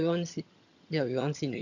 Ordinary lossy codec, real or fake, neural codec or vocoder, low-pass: none; fake; vocoder, 22.05 kHz, 80 mel bands, HiFi-GAN; 7.2 kHz